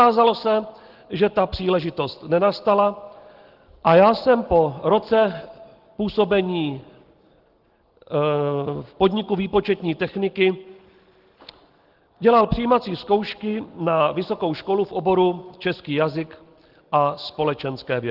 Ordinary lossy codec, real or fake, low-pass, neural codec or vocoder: Opus, 16 kbps; real; 5.4 kHz; none